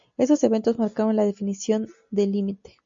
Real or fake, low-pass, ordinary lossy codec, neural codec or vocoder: real; 7.2 kHz; MP3, 48 kbps; none